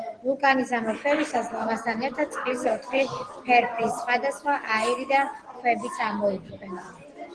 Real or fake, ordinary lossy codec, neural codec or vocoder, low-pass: real; Opus, 16 kbps; none; 9.9 kHz